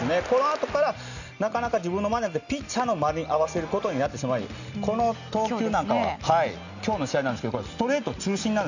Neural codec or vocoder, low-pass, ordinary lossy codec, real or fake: none; 7.2 kHz; none; real